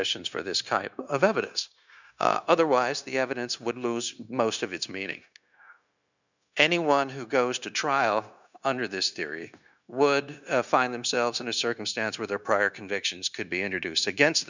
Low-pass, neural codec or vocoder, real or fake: 7.2 kHz; codec, 16 kHz, 0.9 kbps, LongCat-Audio-Codec; fake